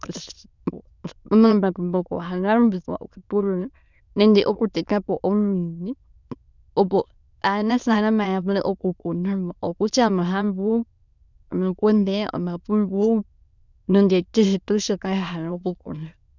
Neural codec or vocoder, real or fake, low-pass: autoencoder, 22.05 kHz, a latent of 192 numbers a frame, VITS, trained on many speakers; fake; 7.2 kHz